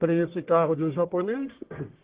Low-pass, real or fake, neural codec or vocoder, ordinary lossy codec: 3.6 kHz; fake; codec, 44.1 kHz, 1.7 kbps, Pupu-Codec; Opus, 16 kbps